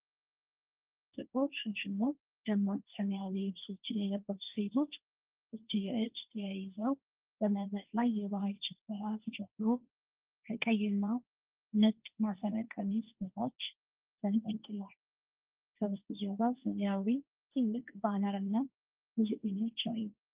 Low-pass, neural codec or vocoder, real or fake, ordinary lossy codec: 3.6 kHz; codec, 16 kHz, 1.1 kbps, Voila-Tokenizer; fake; Opus, 32 kbps